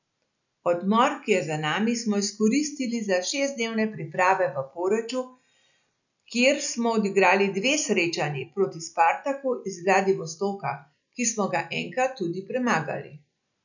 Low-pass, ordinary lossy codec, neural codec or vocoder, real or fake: 7.2 kHz; none; none; real